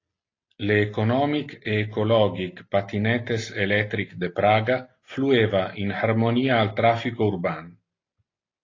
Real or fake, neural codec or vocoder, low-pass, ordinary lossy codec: real; none; 7.2 kHz; AAC, 32 kbps